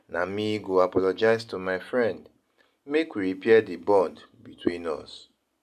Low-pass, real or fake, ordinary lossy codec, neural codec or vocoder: 14.4 kHz; real; none; none